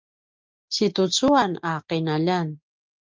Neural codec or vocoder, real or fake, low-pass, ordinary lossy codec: none; real; 7.2 kHz; Opus, 24 kbps